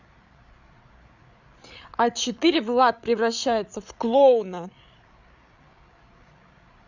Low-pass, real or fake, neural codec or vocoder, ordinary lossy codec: 7.2 kHz; fake; codec, 16 kHz, 8 kbps, FreqCodec, larger model; none